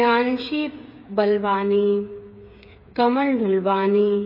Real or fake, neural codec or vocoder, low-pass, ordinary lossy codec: fake; codec, 16 kHz, 8 kbps, FreqCodec, smaller model; 5.4 kHz; MP3, 24 kbps